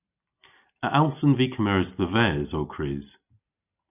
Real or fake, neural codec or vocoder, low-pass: real; none; 3.6 kHz